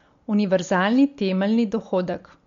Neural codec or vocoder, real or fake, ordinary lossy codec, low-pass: none; real; MP3, 48 kbps; 7.2 kHz